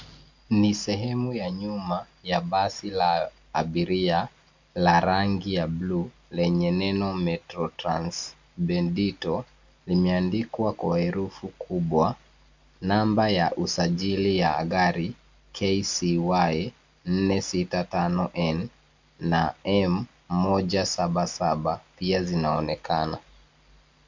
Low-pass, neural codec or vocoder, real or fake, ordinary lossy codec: 7.2 kHz; none; real; MP3, 64 kbps